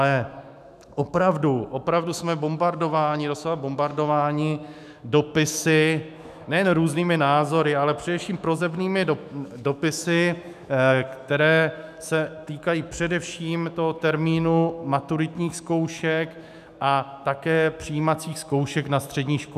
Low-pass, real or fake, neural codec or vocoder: 14.4 kHz; fake; autoencoder, 48 kHz, 128 numbers a frame, DAC-VAE, trained on Japanese speech